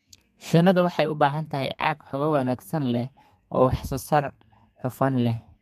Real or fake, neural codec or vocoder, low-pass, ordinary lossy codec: fake; codec, 32 kHz, 1.9 kbps, SNAC; 14.4 kHz; MP3, 64 kbps